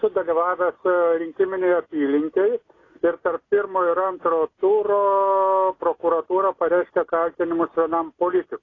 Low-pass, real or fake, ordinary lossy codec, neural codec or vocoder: 7.2 kHz; real; AAC, 32 kbps; none